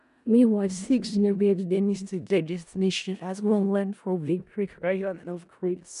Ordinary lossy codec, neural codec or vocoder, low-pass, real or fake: none; codec, 16 kHz in and 24 kHz out, 0.4 kbps, LongCat-Audio-Codec, four codebook decoder; 10.8 kHz; fake